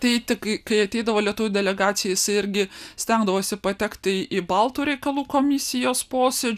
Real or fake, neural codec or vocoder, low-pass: real; none; 14.4 kHz